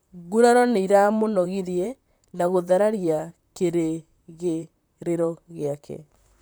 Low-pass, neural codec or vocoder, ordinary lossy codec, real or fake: none; vocoder, 44.1 kHz, 128 mel bands, Pupu-Vocoder; none; fake